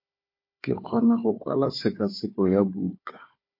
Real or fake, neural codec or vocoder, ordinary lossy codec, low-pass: fake; codec, 16 kHz, 4 kbps, FunCodec, trained on Chinese and English, 50 frames a second; MP3, 32 kbps; 5.4 kHz